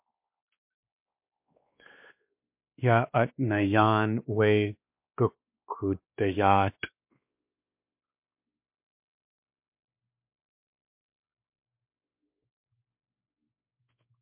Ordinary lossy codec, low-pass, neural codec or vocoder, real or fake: MP3, 32 kbps; 3.6 kHz; codec, 16 kHz, 2 kbps, X-Codec, WavLM features, trained on Multilingual LibriSpeech; fake